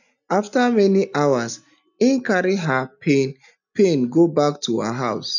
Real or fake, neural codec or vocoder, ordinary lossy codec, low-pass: real; none; none; 7.2 kHz